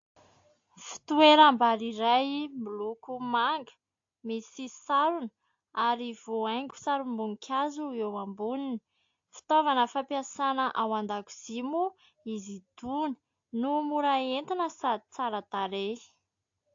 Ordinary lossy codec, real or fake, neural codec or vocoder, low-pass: AAC, 48 kbps; real; none; 7.2 kHz